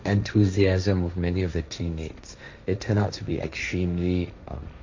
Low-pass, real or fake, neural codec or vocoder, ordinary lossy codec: 7.2 kHz; fake; codec, 16 kHz, 1.1 kbps, Voila-Tokenizer; MP3, 64 kbps